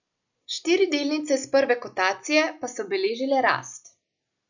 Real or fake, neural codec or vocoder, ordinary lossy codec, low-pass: real; none; none; 7.2 kHz